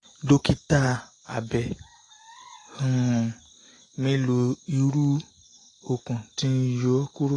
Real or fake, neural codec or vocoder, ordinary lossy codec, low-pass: real; none; AAC, 32 kbps; 10.8 kHz